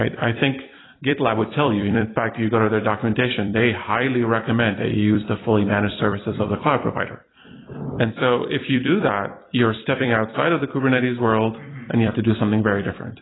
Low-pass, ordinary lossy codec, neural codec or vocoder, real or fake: 7.2 kHz; AAC, 16 kbps; vocoder, 44.1 kHz, 128 mel bands every 256 samples, BigVGAN v2; fake